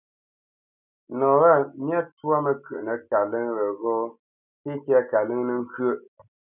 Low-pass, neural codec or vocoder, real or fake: 3.6 kHz; none; real